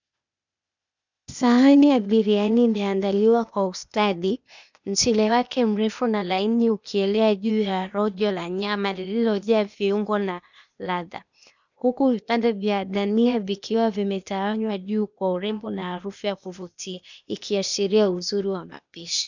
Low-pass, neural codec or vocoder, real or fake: 7.2 kHz; codec, 16 kHz, 0.8 kbps, ZipCodec; fake